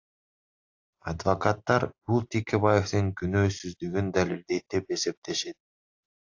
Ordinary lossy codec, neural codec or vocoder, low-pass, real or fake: AAC, 48 kbps; none; 7.2 kHz; real